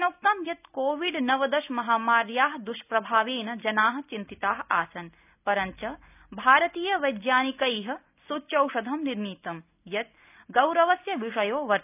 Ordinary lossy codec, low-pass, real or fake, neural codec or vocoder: none; 3.6 kHz; real; none